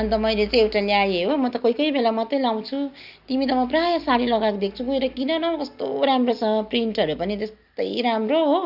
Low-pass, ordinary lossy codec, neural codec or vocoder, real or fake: 5.4 kHz; Opus, 64 kbps; none; real